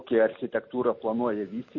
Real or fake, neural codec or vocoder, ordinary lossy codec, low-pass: real; none; MP3, 32 kbps; 7.2 kHz